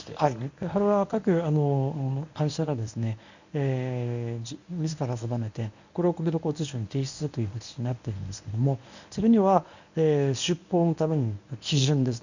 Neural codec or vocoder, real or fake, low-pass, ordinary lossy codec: codec, 24 kHz, 0.9 kbps, WavTokenizer, medium speech release version 1; fake; 7.2 kHz; none